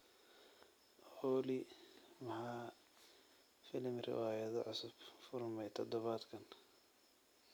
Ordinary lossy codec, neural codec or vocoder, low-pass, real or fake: none; none; none; real